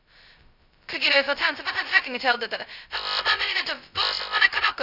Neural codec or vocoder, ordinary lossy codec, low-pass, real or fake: codec, 16 kHz, 0.2 kbps, FocalCodec; none; 5.4 kHz; fake